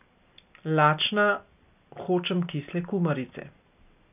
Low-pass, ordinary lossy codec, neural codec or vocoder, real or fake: 3.6 kHz; none; none; real